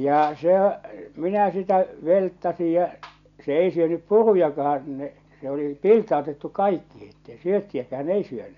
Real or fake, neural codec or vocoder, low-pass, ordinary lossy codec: real; none; 7.2 kHz; none